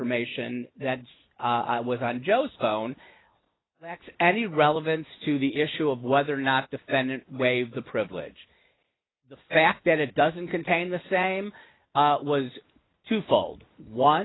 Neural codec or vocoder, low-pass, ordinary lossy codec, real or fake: none; 7.2 kHz; AAC, 16 kbps; real